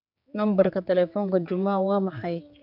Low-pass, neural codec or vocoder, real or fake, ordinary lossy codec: 5.4 kHz; codec, 16 kHz, 4 kbps, X-Codec, HuBERT features, trained on general audio; fake; AAC, 48 kbps